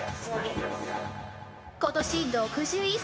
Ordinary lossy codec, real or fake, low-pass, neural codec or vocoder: none; fake; none; codec, 16 kHz, 0.9 kbps, LongCat-Audio-Codec